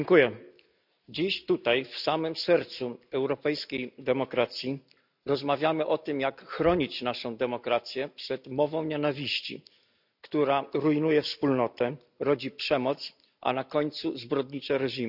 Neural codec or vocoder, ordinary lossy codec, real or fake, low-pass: none; none; real; 5.4 kHz